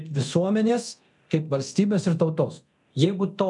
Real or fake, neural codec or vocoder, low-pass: fake; codec, 24 kHz, 0.9 kbps, DualCodec; 10.8 kHz